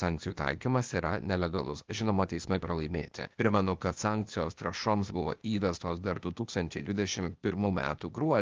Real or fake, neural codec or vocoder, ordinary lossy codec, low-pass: fake; codec, 16 kHz, 0.8 kbps, ZipCodec; Opus, 24 kbps; 7.2 kHz